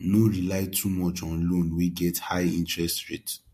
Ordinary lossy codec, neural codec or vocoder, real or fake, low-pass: MP3, 64 kbps; none; real; 14.4 kHz